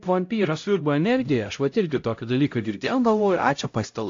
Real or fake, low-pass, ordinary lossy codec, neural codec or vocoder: fake; 7.2 kHz; AAC, 48 kbps; codec, 16 kHz, 0.5 kbps, X-Codec, HuBERT features, trained on LibriSpeech